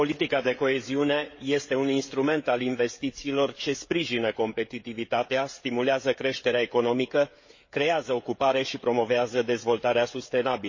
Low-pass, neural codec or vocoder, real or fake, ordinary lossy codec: 7.2 kHz; codec, 16 kHz, 16 kbps, FreqCodec, smaller model; fake; MP3, 32 kbps